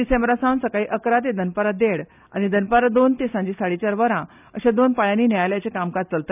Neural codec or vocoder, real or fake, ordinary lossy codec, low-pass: none; real; none; 3.6 kHz